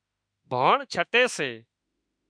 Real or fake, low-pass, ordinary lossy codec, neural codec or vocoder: fake; 9.9 kHz; MP3, 96 kbps; autoencoder, 48 kHz, 32 numbers a frame, DAC-VAE, trained on Japanese speech